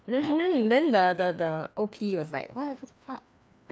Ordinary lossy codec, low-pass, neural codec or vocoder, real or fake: none; none; codec, 16 kHz, 2 kbps, FreqCodec, larger model; fake